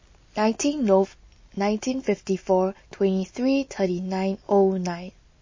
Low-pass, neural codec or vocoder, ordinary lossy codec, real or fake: 7.2 kHz; none; MP3, 32 kbps; real